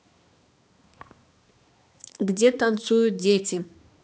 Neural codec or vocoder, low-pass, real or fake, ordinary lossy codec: codec, 16 kHz, 2 kbps, X-Codec, HuBERT features, trained on balanced general audio; none; fake; none